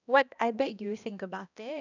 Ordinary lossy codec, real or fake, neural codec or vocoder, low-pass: none; fake; codec, 16 kHz, 1 kbps, X-Codec, HuBERT features, trained on balanced general audio; 7.2 kHz